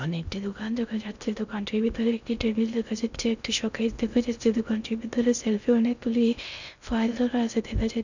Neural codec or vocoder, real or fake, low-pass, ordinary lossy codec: codec, 16 kHz in and 24 kHz out, 0.6 kbps, FocalCodec, streaming, 2048 codes; fake; 7.2 kHz; none